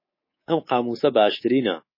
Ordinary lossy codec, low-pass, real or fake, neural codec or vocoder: MP3, 24 kbps; 5.4 kHz; fake; codec, 24 kHz, 3.1 kbps, DualCodec